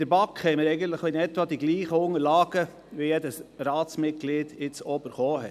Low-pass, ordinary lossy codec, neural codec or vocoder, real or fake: 14.4 kHz; none; none; real